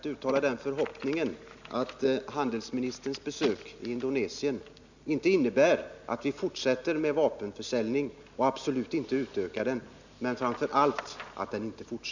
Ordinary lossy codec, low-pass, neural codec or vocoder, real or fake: none; 7.2 kHz; none; real